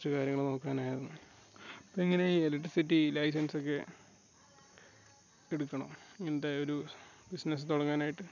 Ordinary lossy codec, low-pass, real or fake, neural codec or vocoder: none; 7.2 kHz; real; none